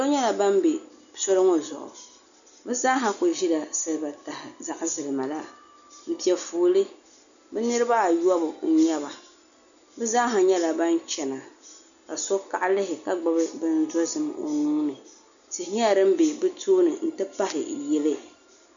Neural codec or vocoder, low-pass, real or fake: none; 7.2 kHz; real